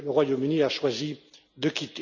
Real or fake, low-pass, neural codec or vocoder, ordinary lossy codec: real; 7.2 kHz; none; none